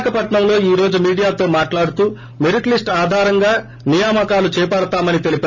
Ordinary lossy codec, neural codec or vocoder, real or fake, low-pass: none; none; real; 7.2 kHz